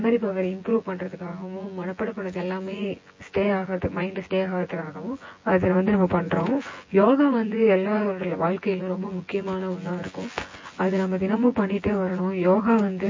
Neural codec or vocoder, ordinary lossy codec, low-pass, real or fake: vocoder, 24 kHz, 100 mel bands, Vocos; MP3, 32 kbps; 7.2 kHz; fake